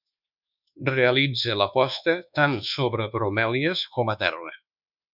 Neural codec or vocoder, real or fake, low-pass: codec, 24 kHz, 1.2 kbps, DualCodec; fake; 5.4 kHz